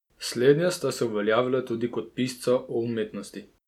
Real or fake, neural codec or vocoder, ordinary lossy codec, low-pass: real; none; none; 19.8 kHz